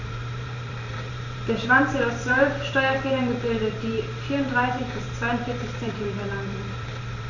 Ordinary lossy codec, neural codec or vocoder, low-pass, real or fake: none; vocoder, 44.1 kHz, 128 mel bands every 512 samples, BigVGAN v2; 7.2 kHz; fake